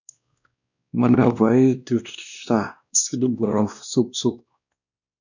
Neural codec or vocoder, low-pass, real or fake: codec, 16 kHz, 1 kbps, X-Codec, WavLM features, trained on Multilingual LibriSpeech; 7.2 kHz; fake